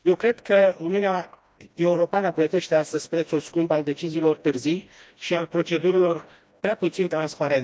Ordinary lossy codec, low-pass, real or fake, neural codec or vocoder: none; none; fake; codec, 16 kHz, 1 kbps, FreqCodec, smaller model